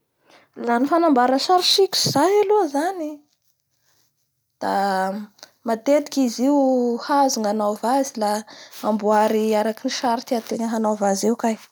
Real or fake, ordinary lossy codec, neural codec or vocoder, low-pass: real; none; none; none